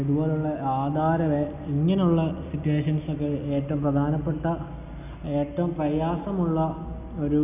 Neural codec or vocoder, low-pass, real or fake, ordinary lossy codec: none; 3.6 kHz; real; none